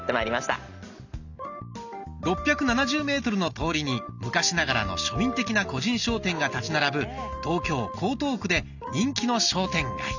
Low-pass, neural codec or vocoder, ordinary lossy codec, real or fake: 7.2 kHz; none; none; real